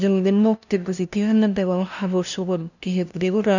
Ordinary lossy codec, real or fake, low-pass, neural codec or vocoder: none; fake; 7.2 kHz; codec, 16 kHz, 0.5 kbps, FunCodec, trained on LibriTTS, 25 frames a second